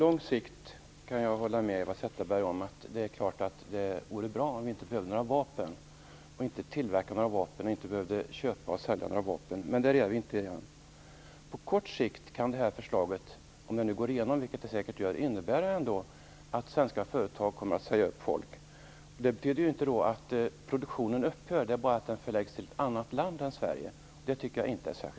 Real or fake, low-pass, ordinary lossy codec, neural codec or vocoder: real; none; none; none